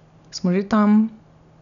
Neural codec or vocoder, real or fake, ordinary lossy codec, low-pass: none; real; none; 7.2 kHz